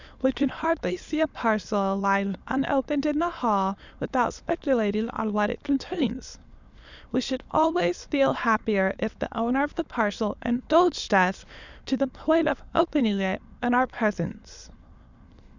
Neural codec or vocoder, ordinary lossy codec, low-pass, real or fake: autoencoder, 22.05 kHz, a latent of 192 numbers a frame, VITS, trained on many speakers; Opus, 64 kbps; 7.2 kHz; fake